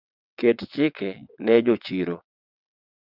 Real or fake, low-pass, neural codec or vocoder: fake; 5.4 kHz; vocoder, 22.05 kHz, 80 mel bands, WaveNeXt